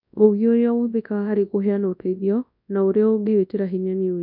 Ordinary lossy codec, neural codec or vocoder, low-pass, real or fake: none; codec, 24 kHz, 0.9 kbps, WavTokenizer, large speech release; 5.4 kHz; fake